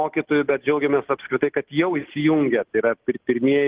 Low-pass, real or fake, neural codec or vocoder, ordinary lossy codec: 3.6 kHz; real; none; Opus, 16 kbps